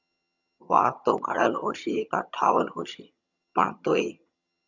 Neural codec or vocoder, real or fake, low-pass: vocoder, 22.05 kHz, 80 mel bands, HiFi-GAN; fake; 7.2 kHz